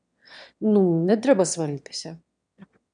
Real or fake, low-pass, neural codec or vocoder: fake; 9.9 kHz; autoencoder, 22.05 kHz, a latent of 192 numbers a frame, VITS, trained on one speaker